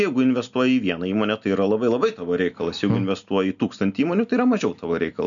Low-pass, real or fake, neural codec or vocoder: 7.2 kHz; real; none